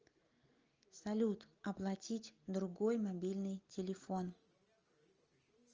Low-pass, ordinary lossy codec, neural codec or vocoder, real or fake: 7.2 kHz; Opus, 24 kbps; none; real